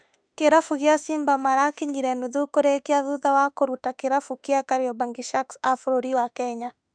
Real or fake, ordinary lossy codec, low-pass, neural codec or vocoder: fake; none; 9.9 kHz; autoencoder, 48 kHz, 32 numbers a frame, DAC-VAE, trained on Japanese speech